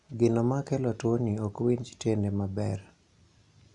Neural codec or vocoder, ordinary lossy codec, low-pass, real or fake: none; none; 10.8 kHz; real